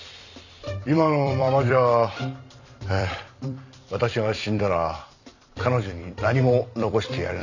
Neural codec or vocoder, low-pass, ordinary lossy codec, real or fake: vocoder, 44.1 kHz, 128 mel bands every 256 samples, BigVGAN v2; 7.2 kHz; none; fake